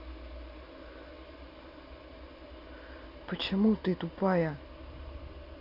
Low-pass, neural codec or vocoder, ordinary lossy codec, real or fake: 5.4 kHz; none; none; real